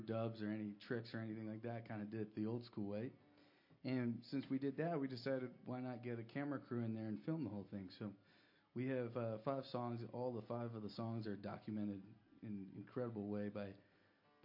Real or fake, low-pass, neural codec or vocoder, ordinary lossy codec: real; 5.4 kHz; none; MP3, 32 kbps